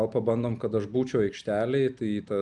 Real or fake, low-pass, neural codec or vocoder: real; 10.8 kHz; none